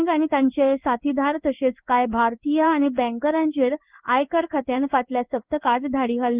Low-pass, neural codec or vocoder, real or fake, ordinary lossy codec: 3.6 kHz; codec, 16 kHz in and 24 kHz out, 1 kbps, XY-Tokenizer; fake; Opus, 24 kbps